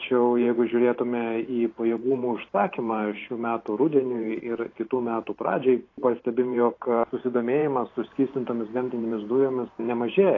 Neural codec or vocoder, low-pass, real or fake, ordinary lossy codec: vocoder, 44.1 kHz, 128 mel bands every 512 samples, BigVGAN v2; 7.2 kHz; fake; AAC, 32 kbps